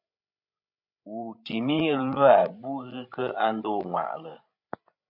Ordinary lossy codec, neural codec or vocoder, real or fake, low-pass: MP3, 48 kbps; codec, 16 kHz, 16 kbps, FreqCodec, larger model; fake; 5.4 kHz